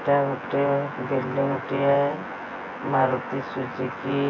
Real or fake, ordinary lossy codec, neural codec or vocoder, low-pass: fake; AAC, 48 kbps; vocoder, 24 kHz, 100 mel bands, Vocos; 7.2 kHz